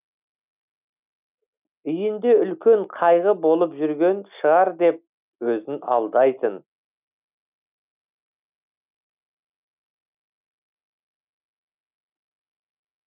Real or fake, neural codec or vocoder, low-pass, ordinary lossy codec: real; none; 3.6 kHz; none